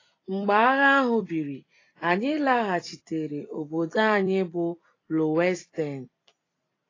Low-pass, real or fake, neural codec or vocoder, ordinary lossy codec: 7.2 kHz; fake; vocoder, 44.1 kHz, 128 mel bands every 256 samples, BigVGAN v2; AAC, 32 kbps